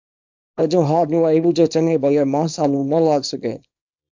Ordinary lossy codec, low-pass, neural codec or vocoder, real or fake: MP3, 64 kbps; 7.2 kHz; codec, 24 kHz, 0.9 kbps, WavTokenizer, small release; fake